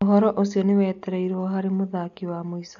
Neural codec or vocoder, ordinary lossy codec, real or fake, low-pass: none; none; real; 7.2 kHz